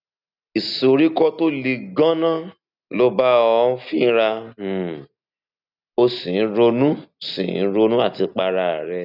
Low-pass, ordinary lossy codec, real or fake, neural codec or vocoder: 5.4 kHz; none; real; none